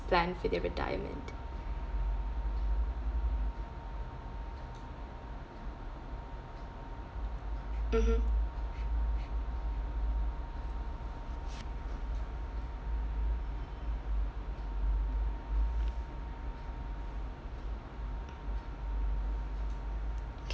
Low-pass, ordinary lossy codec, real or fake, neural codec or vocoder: none; none; real; none